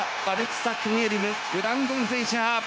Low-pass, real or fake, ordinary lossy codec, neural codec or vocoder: none; fake; none; codec, 16 kHz, 0.9 kbps, LongCat-Audio-Codec